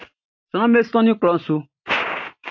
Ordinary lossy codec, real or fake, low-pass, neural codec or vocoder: AAC, 48 kbps; real; 7.2 kHz; none